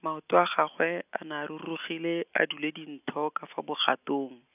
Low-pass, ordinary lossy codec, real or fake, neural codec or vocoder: 3.6 kHz; none; real; none